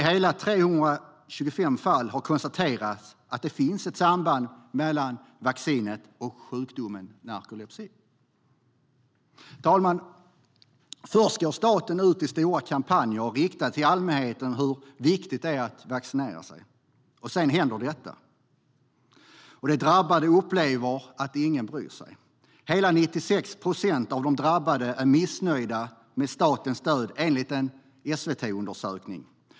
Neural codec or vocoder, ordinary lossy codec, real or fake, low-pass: none; none; real; none